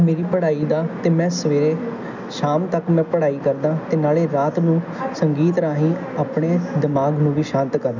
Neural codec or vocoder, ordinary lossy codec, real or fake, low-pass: none; none; real; 7.2 kHz